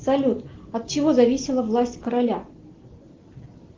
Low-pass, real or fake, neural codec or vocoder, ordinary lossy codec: 7.2 kHz; real; none; Opus, 16 kbps